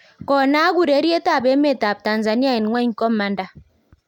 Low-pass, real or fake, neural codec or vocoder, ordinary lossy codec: 19.8 kHz; real; none; none